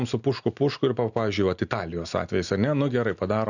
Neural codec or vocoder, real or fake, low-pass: none; real; 7.2 kHz